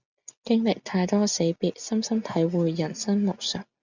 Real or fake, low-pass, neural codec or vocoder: real; 7.2 kHz; none